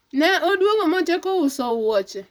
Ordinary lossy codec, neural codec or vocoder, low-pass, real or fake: none; vocoder, 44.1 kHz, 128 mel bands, Pupu-Vocoder; none; fake